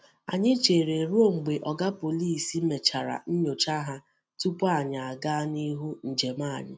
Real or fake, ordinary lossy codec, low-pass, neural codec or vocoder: real; none; none; none